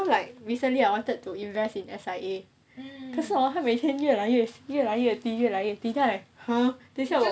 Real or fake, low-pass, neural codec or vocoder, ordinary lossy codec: real; none; none; none